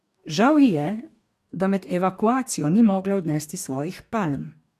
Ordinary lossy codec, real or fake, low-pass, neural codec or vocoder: AAC, 96 kbps; fake; 14.4 kHz; codec, 44.1 kHz, 2.6 kbps, DAC